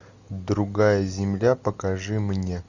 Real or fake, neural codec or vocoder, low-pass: real; none; 7.2 kHz